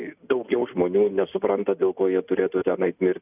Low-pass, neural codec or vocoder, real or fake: 3.6 kHz; none; real